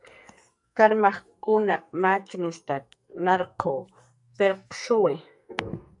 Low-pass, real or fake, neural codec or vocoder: 10.8 kHz; fake; codec, 44.1 kHz, 2.6 kbps, SNAC